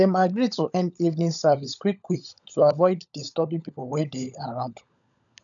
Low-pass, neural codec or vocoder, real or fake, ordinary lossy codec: 7.2 kHz; codec, 16 kHz, 16 kbps, FunCodec, trained on LibriTTS, 50 frames a second; fake; none